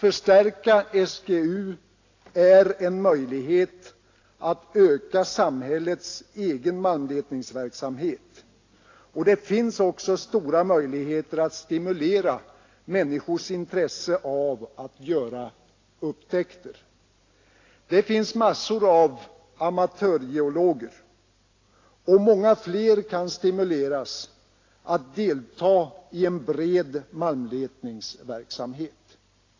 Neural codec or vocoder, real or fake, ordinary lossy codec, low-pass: none; real; AAC, 32 kbps; 7.2 kHz